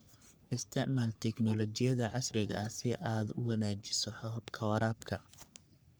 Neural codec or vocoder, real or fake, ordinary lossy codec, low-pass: codec, 44.1 kHz, 3.4 kbps, Pupu-Codec; fake; none; none